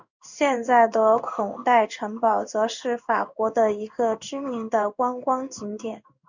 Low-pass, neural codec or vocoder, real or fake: 7.2 kHz; codec, 16 kHz in and 24 kHz out, 1 kbps, XY-Tokenizer; fake